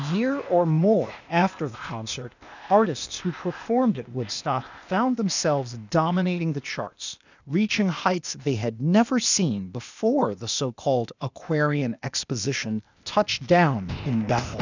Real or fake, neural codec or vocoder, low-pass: fake; codec, 16 kHz, 0.8 kbps, ZipCodec; 7.2 kHz